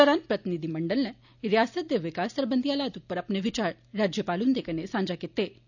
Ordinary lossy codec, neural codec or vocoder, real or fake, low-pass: none; none; real; 7.2 kHz